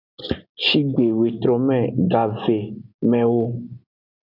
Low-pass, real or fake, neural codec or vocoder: 5.4 kHz; real; none